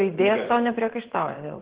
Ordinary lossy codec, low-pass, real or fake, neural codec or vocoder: Opus, 16 kbps; 3.6 kHz; fake; vocoder, 24 kHz, 100 mel bands, Vocos